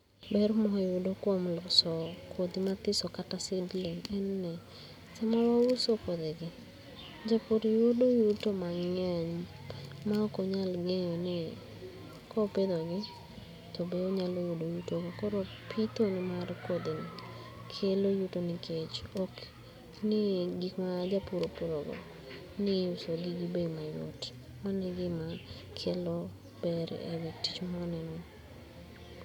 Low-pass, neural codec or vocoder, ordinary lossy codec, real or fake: 19.8 kHz; none; none; real